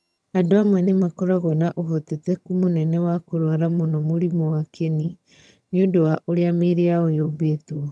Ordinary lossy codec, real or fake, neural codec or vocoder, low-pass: none; fake; vocoder, 22.05 kHz, 80 mel bands, HiFi-GAN; none